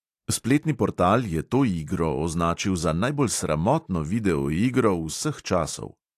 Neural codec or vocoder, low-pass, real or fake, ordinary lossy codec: none; 14.4 kHz; real; AAC, 64 kbps